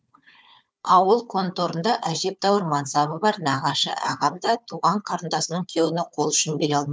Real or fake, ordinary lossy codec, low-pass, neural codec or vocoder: fake; none; none; codec, 16 kHz, 4 kbps, FunCodec, trained on Chinese and English, 50 frames a second